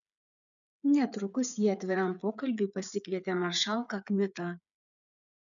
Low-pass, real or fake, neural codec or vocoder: 7.2 kHz; fake; codec, 16 kHz, 8 kbps, FreqCodec, smaller model